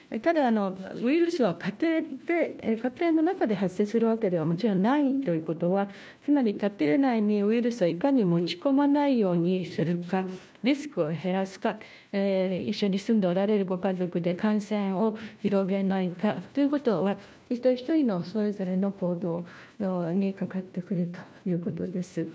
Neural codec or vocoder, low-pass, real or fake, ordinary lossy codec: codec, 16 kHz, 1 kbps, FunCodec, trained on LibriTTS, 50 frames a second; none; fake; none